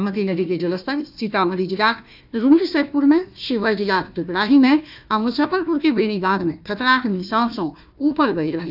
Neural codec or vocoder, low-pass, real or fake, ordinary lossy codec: codec, 16 kHz, 1 kbps, FunCodec, trained on Chinese and English, 50 frames a second; 5.4 kHz; fake; none